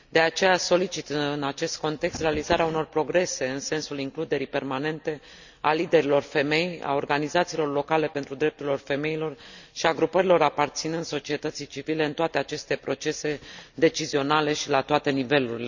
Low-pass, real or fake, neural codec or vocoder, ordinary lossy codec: 7.2 kHz; real; none; none